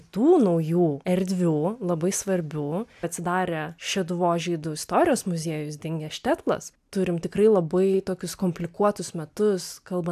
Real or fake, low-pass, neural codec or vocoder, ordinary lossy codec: real; 14.4 kHz; none; AAC, 96 kbps